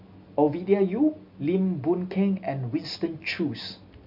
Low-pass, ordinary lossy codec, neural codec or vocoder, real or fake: 5.4 kHz; MP3, 48 kbps; none; real